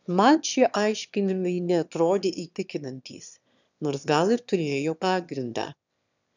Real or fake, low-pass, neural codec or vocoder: fake; 7.2 kHz; autoencoder, 22.05 kHz, a latent of 192 numbers a frame, VITS, trained on one speaker